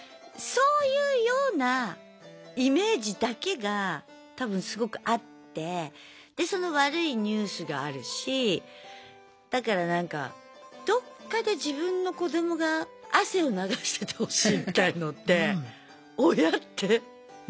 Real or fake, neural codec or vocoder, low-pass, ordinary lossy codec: real; none; none; none